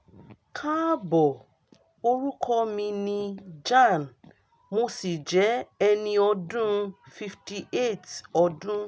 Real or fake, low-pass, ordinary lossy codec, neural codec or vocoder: real; none; none; none